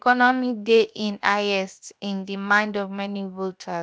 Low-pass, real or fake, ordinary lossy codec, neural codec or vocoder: none; fake; none; codec, 16 kHz, 0.7 kbps, FocalCodec